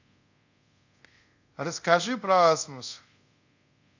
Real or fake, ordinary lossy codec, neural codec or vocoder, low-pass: fake; none; codec, 24 kHz, 0.5 kbps, DualCodec; 7.2 kHz